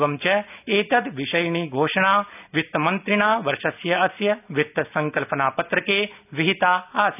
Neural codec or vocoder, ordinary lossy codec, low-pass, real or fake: none; none; 3.6 kHz; real